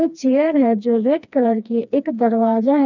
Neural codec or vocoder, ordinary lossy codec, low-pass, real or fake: codec, 16 kHz, 2 kbps, FreqCodec, smaller model; none; 7.2 kHz; fake